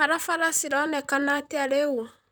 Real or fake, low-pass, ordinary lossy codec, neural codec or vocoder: fake; none; none; vocoder, 44.1 kHz, 128 mel bands, Pupu-Vocoder